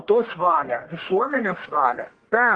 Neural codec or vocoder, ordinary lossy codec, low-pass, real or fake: codec, 44.1 kHz, 1.7 kbps, Pupu-Codec; Opus, 24 kbps; 9.9 kHz; fake